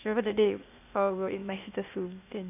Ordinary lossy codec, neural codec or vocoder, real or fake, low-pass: none; codec, 16 kHz, 0.8 kbps, ZipCodec; fake; 3.6 kHz